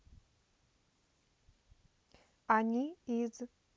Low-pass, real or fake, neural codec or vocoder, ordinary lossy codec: none; real; none; none